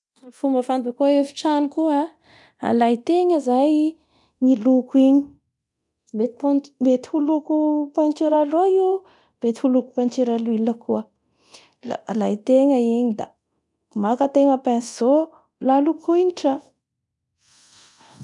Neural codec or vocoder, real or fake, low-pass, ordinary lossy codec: codec, 24 kHz, 0.9 kbps, DualCodec; fake; 10.8 kHz; none